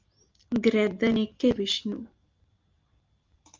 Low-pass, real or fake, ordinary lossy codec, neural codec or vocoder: 7.2 kHz; real; Opus, 32 kbps; none